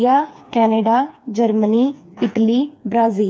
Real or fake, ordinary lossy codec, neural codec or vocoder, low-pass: fake; none; codec, 16 kHz, 4 kbps, FreqCodec, smaller model; none